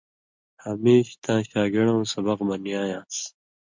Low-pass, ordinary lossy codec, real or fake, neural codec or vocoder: 7.2 kHz; MP3, 64 kbps; real; none